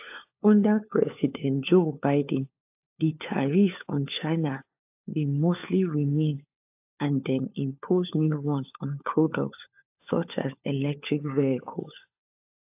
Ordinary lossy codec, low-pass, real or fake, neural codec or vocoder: AAC, 32 kbps; 3.6 kHz; fake; codec, 16 kHz, 4 kbps, FunCodec, trained on LibriTTS, 50 frames a second